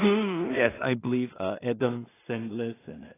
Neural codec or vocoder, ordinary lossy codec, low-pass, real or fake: codec, 16 kHz in and 24 kHz out, 0.4 kbps, LongCat-Audio-Codec, two codebook decoder; AAC, 16 kbps; 3.6 kHz; fake